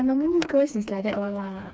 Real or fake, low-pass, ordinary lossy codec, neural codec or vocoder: fake; none; none; codec, 16 kHz, 2 kbps, FreqCodec, smaller model